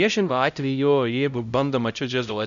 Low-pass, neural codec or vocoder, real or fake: 7.2 kHz; codec, 16 kHz, 0.5 kbps, X-Codec, HuBERT features, trained on LibriSpeech; fake